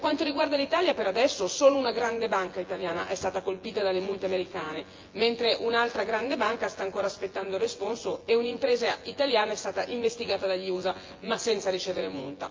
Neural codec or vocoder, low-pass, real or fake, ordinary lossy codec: vocoder, 24 kHz, 100 mel bands, Vocos; 7.2 kHz; fake; Opus, 24 kbps